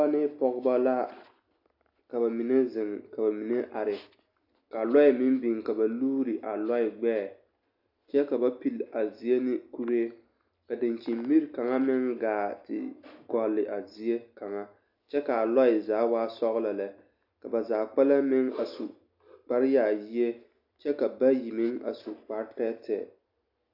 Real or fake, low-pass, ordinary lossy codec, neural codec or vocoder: real; 5.4 kHz; AAC, 48 kbps; none